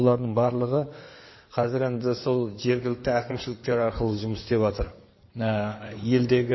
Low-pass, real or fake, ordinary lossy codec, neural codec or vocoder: 7.2 kHz; fake; MP3, 24 kbps; codec, 16 kHz in and 24 kHz out, 2.2 kbps, FireRedTTS-2 codec